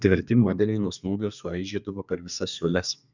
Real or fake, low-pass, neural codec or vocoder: fake; 7.2 kHz; codec, 32 kHz, 1.9 kbps, SNAC